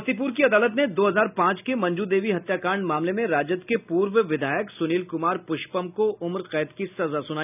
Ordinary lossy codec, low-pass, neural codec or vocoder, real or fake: none; 3.6 kHz; none; real